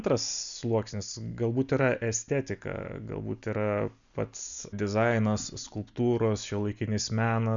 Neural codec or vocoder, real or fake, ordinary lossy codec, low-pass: none; real; MP3, 96 kbps; 7.2 kHz